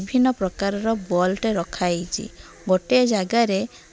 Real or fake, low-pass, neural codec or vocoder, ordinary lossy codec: real; none; none; none